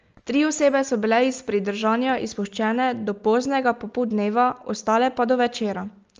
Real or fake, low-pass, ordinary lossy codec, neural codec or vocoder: real; 7.2 kHz; Opus, 24 kbps; none